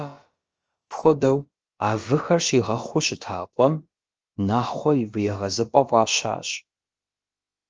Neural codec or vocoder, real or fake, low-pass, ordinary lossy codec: codec, 16 kHz, about 1 kbps, DyCAST, with the encoder's durations; fake; 7.2 kHz; Opus, 24 kbps